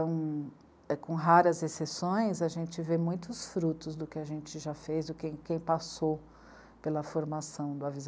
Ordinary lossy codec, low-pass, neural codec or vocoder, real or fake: none; none; none; real